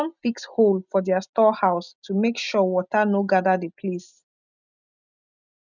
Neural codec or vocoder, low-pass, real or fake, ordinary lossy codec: none; 7.2 kHz; real; none